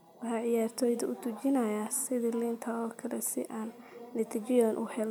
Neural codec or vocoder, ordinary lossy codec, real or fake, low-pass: none; none; real; none